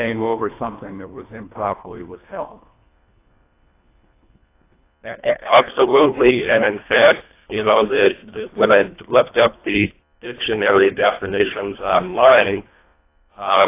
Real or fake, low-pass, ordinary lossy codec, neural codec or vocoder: fake; 3.6 kHz; AAC, 24 kbps; codec, 24 kHz, 1.5 kbps, HILCodec